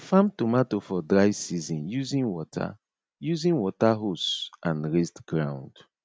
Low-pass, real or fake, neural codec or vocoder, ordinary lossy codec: none; real; none; none